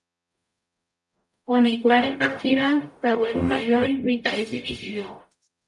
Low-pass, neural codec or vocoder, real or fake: 10.8 kHz; codec, 44.1 kHz, 0.9 kbps, DAC; fake